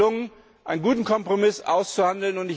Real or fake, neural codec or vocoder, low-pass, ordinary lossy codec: real; none; none; none